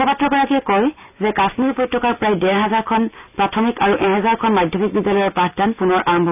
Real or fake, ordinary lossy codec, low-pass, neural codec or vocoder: real; none; 3.6 kHz; none